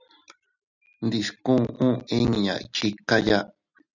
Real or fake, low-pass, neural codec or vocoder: real; 7.2 kHz; none